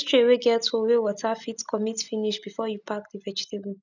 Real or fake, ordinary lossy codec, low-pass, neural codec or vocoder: real; none; 7.2 kHz; none